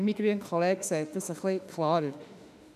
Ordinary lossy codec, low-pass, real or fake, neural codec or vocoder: none; 14.4 kHz; fake; autoencoder, 48 kHz, 32 numbers a frame, DAC-VAE, trained on Japanese speech